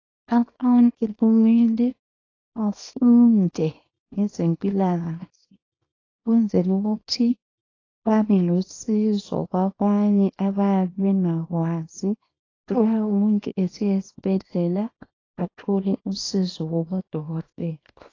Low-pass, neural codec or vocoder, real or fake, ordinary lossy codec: 7.2 kHz; codec, 24 kHz, 0.9 kbps, WavTokenizer, small release; fake; AAC, 32 kbps